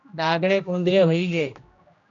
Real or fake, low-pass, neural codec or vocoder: fake; 7.2 kHz; codec, 16 kHz, 1 kbps, X-Codec, HuBERT features, trained on general audio